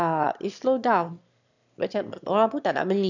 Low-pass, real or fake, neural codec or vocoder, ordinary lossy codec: 7.2 kHz; fake; autoencoder, 22.05 kHz, a latent of 192 numbers a frame, VITS, trained on one speaker; none